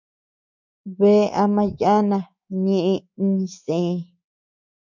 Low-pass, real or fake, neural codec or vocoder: 7.2 kHz; fake; codec, 24 kHz, 3.1 kbps, DualCodec